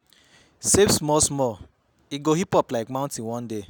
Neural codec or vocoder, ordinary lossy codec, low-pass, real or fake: none; none; none; real